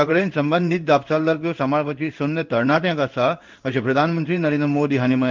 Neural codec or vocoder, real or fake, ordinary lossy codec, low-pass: codec, 16 kHz in and 24 kHz out, 1 kbps, XY-Tokenizer; fake; Opus, 32 kbps; 7.2 kHz